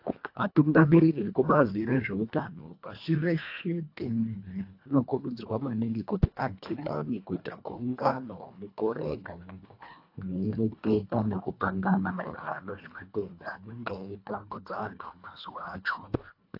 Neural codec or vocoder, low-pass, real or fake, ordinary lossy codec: codec, 24 kHz, 1.5 kbps, HILCodec; 5.4 kHz; fake; AAC, 32 kbps